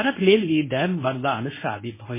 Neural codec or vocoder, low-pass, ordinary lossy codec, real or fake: codec, 24 kHz, 0.9 kbps, WavTokenizer, medium speech release version 1; 3.6 kHz; MP3, 16 kbps; fake